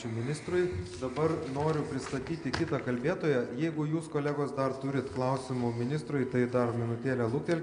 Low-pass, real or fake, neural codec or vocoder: 9.9 kHz; real; none